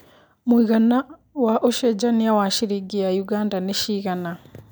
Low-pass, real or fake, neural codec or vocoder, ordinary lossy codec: none; real; none; none